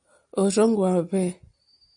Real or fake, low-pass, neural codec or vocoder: real; 9.9 kHz; none